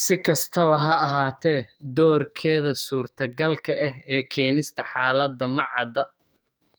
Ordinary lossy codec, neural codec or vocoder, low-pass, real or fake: none; codec, 44.1 kHz, 2.6 kbps, SNAC; none; fake